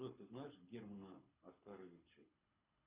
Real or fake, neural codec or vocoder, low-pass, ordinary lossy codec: fake; codec, 24 kHz, 6 kbps, HILCodec; 3.6 kHz; Opus, 64 kbps